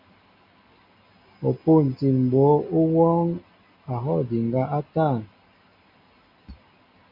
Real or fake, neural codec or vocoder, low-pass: real; none; 5.4 kHz